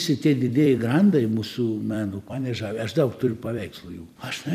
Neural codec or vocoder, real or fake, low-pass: vocoder, 44.1 kHz, 128 mel bands every 256 samples, BigVGAN v2; fake; 14.4 kHz